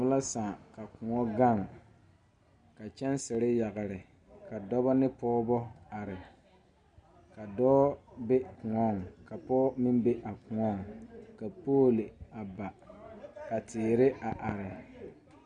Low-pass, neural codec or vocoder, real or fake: 9.9 kHz; none; real